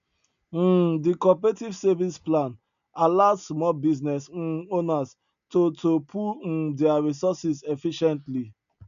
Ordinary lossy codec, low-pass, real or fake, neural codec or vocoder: none; 7.2 kHz; real; none